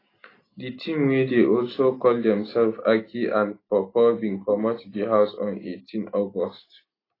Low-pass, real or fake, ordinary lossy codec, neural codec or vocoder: 5.4 kHz; real; AAC, 24 kbps; none